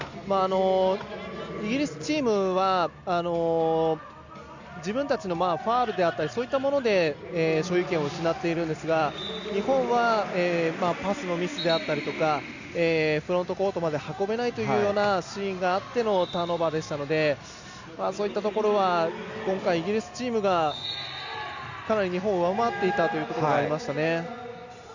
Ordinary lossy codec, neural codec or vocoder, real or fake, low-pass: Opus, 64 kbps; none; real; 7.2 kHz